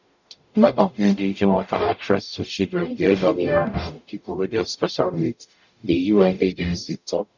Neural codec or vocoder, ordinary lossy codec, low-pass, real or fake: codec, 44.1 kHz, 0.9 kbps, DAC; none; 7.2 kHz; fake